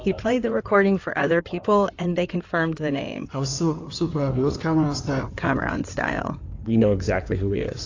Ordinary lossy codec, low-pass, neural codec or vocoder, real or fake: AAC, 48 kbps; 7.2 kHz; codec, 16 kHz in and 24 kHz out, 2.2 kbps, FireRedTTS-2 codec; fake